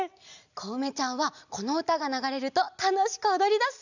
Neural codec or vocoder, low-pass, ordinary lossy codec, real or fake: none; 7.2 kHz; none; real